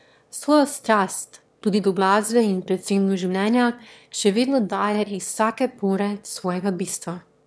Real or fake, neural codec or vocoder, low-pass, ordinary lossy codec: fake; autoencoder, 22.05 kHz, a latent of 192 numbers a frame, VITS, trained on one speaker; none; none